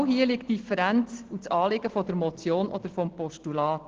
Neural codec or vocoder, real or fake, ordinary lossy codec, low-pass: none; real; Opus, 16 kbps; 7.2 kHz